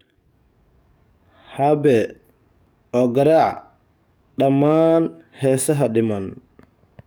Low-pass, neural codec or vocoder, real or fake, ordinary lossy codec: none; codec, 44.1 kHz, 7.8 kbps, DAC; fake; none